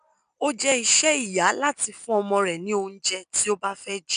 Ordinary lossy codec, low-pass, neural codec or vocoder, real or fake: none; 9.9 kHz; none; real